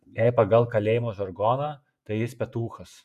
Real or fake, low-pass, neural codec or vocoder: fake; 14.4 kHz; vocoder, 48 kHz, 128 mel bands, Vocos